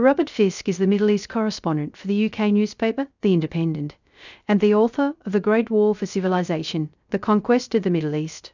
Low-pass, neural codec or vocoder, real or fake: 7.2 kHz; codec, 16 kHz, 0.3 kbps, FocalCodec; fake